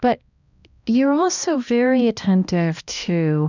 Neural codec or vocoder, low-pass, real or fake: codec, 16 kHz, 1 kbps, X-Codec, HuBERT features, trained on balanced general audio; 7.2 kHz; fake